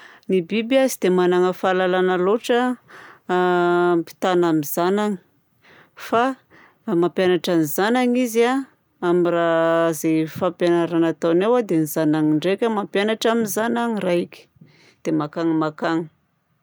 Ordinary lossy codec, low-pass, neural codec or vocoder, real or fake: none; none; none; real